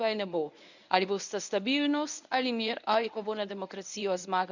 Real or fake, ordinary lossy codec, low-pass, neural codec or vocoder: fake; none; 7.2 kHz; codec, 24 kHz, 0.9 kbps, WavTokenizer, medium speech release version 1